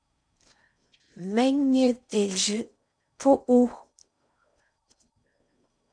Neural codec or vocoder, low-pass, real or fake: codec, 16 kHz in and 24 kHz out, 0.6 kbps, FocalCodec, streaming, 4096 codes; 9.9 kHz; fake